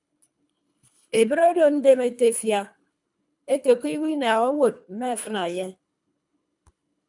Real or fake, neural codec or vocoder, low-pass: fake; codec, 24 kHz, 3 kbps, HILCodec; 10.8 kHz